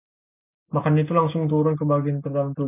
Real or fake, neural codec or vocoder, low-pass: real; none; 3.6 kHz